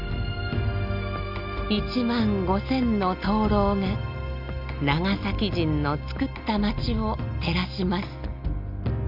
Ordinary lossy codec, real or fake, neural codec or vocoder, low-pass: none; real; none; 5.4 kHz